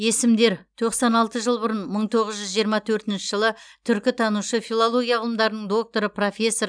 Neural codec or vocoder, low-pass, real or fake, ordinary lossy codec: none; 9.9 kHz; real; none